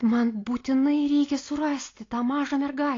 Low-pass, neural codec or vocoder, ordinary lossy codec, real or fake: 7.2 kHz; none; AAC, 32 kbps; real